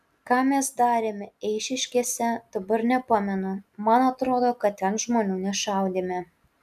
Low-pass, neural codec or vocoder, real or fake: 14.4 kHz; none; real